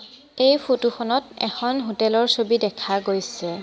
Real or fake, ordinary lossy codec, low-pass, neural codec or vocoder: real; none; none; none